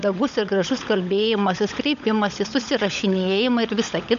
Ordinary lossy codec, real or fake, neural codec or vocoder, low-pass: MP3, 64 kbps; fake; codec, 16 kHz, 16 kbps, FunCodec, trained on LibriTTS, 50 frames a second; 7.2 kHz